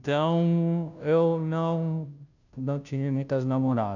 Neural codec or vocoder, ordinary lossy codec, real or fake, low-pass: codec, 16 kHz, 0.5 kbps, FunCodec, trained on Chinese and English, 25 frames a second; none; fake; 7.2 kHz